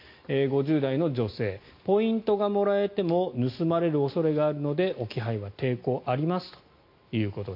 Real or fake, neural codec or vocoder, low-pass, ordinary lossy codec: real; none; 5.4 kHz; MP3, 32 kbps